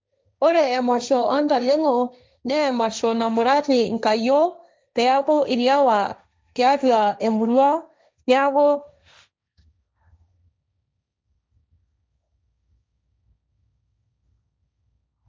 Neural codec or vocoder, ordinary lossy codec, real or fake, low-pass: codec, 16 kHz, 1.1 kbps, Voila-Tokenizer; none; fake; none